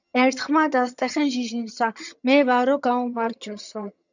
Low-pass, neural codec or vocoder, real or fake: 7.2 kHz; vocoder, 22.05 kHz, 80 mel bands, HiFi-GAN; fake